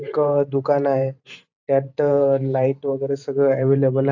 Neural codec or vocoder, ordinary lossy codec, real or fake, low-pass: vocoder, 44.1 kHz, 128 mel bands every 512 samples, BigVGAN v2; AAC, 48 kbps; fake; 7.2 kHz